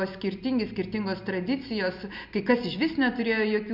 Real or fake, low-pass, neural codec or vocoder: real; 5.4 kHz; none